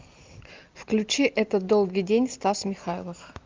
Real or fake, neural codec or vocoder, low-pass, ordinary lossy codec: real; none; 7.2 kHz; Opus, 24 kbps